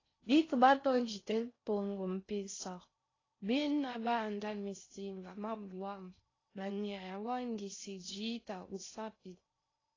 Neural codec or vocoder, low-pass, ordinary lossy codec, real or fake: codec, 16 kHz in and 24 kHz out, 0.6 kbps, FocalCodec, streaming, 4096 codes; 7.2 kHz; AAC, 32 kbps; fake